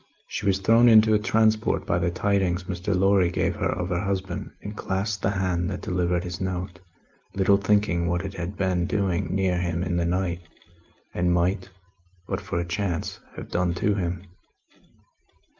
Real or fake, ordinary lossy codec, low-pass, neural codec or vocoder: real; Opus, 24 kbps; 7.2 kHz; none